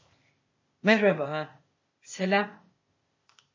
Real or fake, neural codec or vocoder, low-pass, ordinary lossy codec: fake; codec, 16 kHz, 0.8 kbps, ZipCodec; 7.2 kHz; MP3, 32 kbps